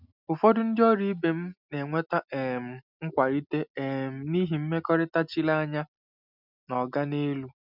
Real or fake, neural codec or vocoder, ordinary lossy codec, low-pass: real; none; none; 5.4 kHz